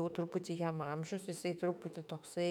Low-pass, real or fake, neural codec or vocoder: 19.8 kHz; fake; autoencoder, 48 kHz, 32 numbers a frame, DAC-VAE, trained on Japanese speech